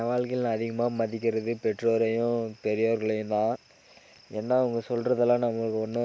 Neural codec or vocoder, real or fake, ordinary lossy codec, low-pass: none; real; none; none